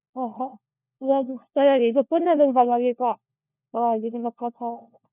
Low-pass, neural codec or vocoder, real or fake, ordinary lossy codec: 3.6 kHz; codec, 16 kHz, 1 kbps, FunCodec, trained on LibriTTS, 50 frames a second; fake; none